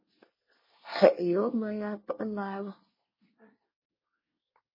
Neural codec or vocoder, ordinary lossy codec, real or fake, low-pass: codec, 24 kHz, 1 kbps, SNAC; MP3, 24 kbps; fake; 5.4 kHz